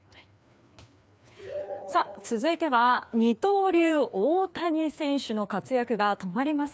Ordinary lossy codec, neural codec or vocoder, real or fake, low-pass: none; codec, 16 kHz, 2 kbps, FreqCodec, larger model; fake; none